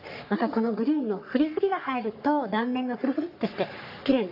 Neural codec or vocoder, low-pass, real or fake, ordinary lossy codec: codec, 44.1 kHz, 3.4 kbps, Pupu-Codec; 5.4 kHz; fake; AAC, 48 kbps